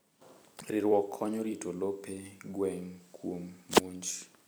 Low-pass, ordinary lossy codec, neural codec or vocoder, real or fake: none; none; vocoder, 44.1 kHz, 128 mel bands every 256 samples, BigVGAN v2; fake